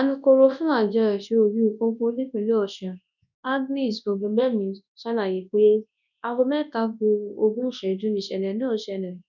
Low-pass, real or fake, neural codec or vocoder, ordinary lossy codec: 7.2 kHz; fake; codec, 24 kHz, 0.9 kbps, WavTokenizer, large speech release; none